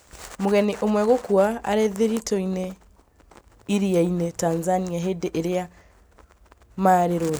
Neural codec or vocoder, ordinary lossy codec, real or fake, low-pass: none; none; real; none